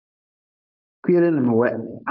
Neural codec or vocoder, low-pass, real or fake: codec, 16 kHz, 4.8 kbps, FACodec; 5.4 kHz; fake